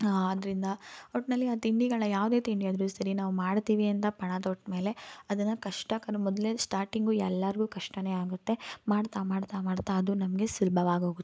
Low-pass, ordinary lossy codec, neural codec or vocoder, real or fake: none; none; none; real